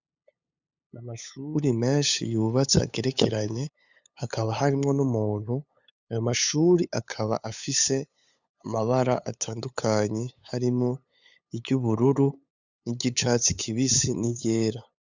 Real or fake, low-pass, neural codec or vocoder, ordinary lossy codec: fake; 7.2 kHz; codec, 16 kHz, 8 kbps, FunCodec, trained on LibriTTS, 25 frames a second; Opus, 64 kbps